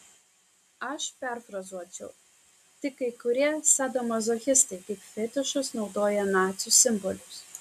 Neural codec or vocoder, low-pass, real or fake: none; 14.4 kHz; real